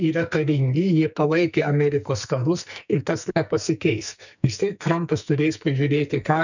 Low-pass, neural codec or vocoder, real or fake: 7.2 kHz; codec, 44.1 kHz, 2.6 kbps, SNAC; fake